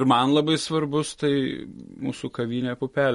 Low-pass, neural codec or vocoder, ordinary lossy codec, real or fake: 14.4 kHz; none; MP3, 48 kbps; real